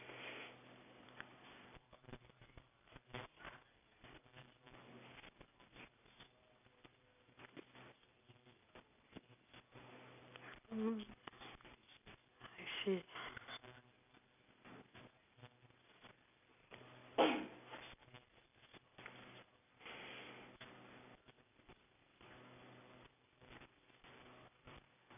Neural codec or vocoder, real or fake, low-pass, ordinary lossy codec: none; real; 3.6 kHz; none